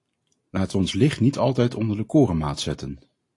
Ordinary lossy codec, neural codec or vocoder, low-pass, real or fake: AAC, 48 kbps; none; 10.8 kHz; real